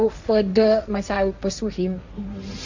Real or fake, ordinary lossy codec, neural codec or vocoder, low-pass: fake; Opus, 64 kbps; codec, 16 kHz, 1.1 kbps, Voila-Tokenizer; 7.2 kHz